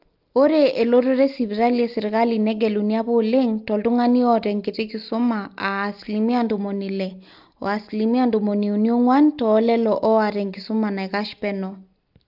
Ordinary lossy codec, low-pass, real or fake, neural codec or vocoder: Opus, 24 kbps; 5.4 kHz; real; none